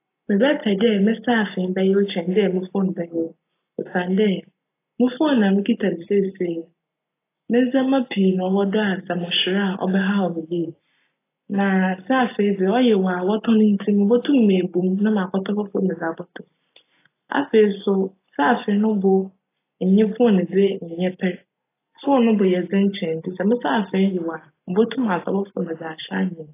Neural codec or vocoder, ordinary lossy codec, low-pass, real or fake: none; AAC, 24 kbps; 3.6 kHz; real